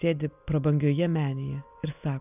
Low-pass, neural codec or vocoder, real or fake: 3.6 kHz; none; real